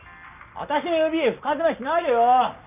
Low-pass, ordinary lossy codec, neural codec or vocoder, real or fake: 3.6 kHz; none; none; real